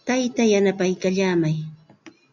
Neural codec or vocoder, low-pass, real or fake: none; 7.2 kHz; real